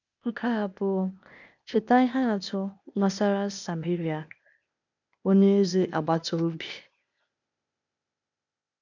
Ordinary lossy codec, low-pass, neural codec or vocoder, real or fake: none; 7.2 kHz; codec, 16 kHz, 0.8 kbps, ZipCodec; fake